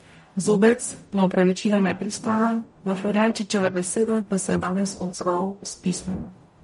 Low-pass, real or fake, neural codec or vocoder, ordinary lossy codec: 19.8 kHz; fake; codec, 44.1 kHz, 0.9 kbps, DAC; MP3, 48 kbps